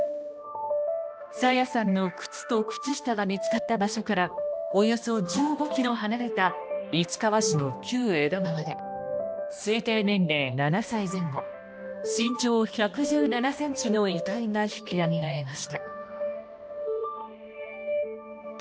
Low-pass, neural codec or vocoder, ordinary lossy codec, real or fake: none; codec, 16 kHz, 1 kbps, X-Codec, HuBERT features, trained on balanced general audio; none; fake